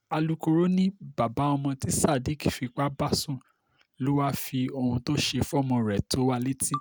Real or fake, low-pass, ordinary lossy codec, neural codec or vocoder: real; none; none; none